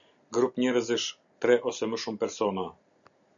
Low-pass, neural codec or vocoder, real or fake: 7.2 kHz; none; real